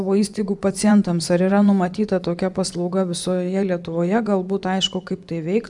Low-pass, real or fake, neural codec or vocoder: 10.8 kHz; fake; vocoder, 24 kHz, 100 mel bands, Vocos